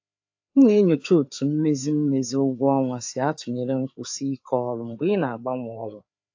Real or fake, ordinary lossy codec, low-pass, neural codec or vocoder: fake; AAC, 48 kbps; 7.2 kHz; codec, 16 kHz, 4 kbps, FreqCodec, larger model